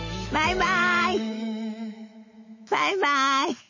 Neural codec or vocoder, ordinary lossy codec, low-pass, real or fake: autoencoder, 48 kHz, 128 numbers a frame, DAC-VAE, trained on Japanese speech; MP3, 32 kbps; 7.2 kHz; fake